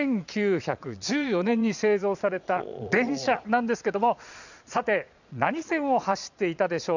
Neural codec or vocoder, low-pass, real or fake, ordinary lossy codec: vocoder, 22.05 kHz, 80 mel bands, Vocos; 7.2 kHz; fake; none